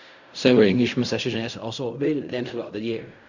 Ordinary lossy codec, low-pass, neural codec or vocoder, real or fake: none; 7.2 kHz; codec, 16 kHz in and 24 kHz out, 0.4 kbps, LongCat-Audio-Codec, fine tuned four codebook decoder; fake